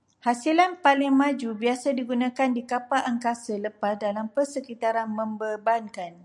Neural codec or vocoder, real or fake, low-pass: none; real; 10.8 kHz